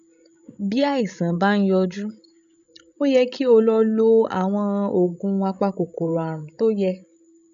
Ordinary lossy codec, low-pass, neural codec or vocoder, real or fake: none; 7.2 kHz; codec, 16 kHz, 16 kbps, FreqCodec, larger model; fake